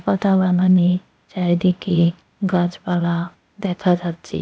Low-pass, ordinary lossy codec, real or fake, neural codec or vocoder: none; none; fake; codec, 16 kHz, 0.8 kbps, ZipCodec